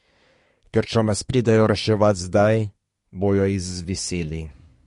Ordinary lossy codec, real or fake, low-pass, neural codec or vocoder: MP3, 48 kbps; fake; 10.8 kHz; codec, 24 kHz, 1 kbps, SNAC